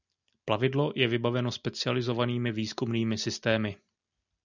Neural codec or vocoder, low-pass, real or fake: none; 7.2 kHz; real